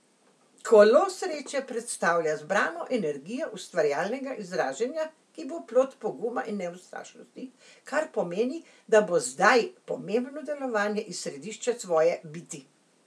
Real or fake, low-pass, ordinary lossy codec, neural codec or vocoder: real; none; none; none